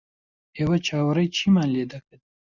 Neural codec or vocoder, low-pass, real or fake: none; 7.2 kHz; real